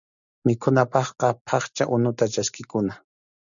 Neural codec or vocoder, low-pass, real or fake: none; 7.2 kHz; real